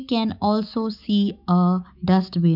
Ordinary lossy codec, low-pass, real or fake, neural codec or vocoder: none; 5.4 kHz; fake; autoencoder, 48 kHz, 128 numbers a frame, DAC-VAE, trained on Japanese speech